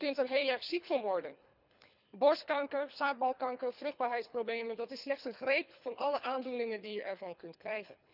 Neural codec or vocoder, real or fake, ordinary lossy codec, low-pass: codec, 24 kHz, 3 kbps, HILCodec; fake; none; 5.4 kHz